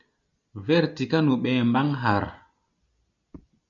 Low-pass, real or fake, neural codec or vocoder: 7.2 kHz; real; none